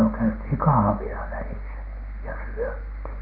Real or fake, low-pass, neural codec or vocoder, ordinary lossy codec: real; 5.4 kHz; none; Opus, 24 kbps